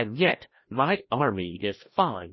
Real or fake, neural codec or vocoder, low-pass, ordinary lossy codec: fake; codec, 16 kHz, 1 kbps, FreqCodec, larger model; 7.2 kHz; MP3, 24 kbps